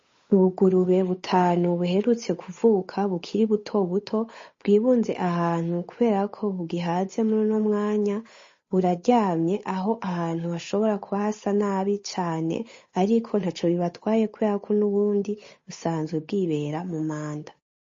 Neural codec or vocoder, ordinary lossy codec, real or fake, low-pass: codec, 16 kHz, 8 kbps, FunCodec, trained on Chinese and English, 25 frames a second; MP3, 32 kbps; fake; 7.2 kHz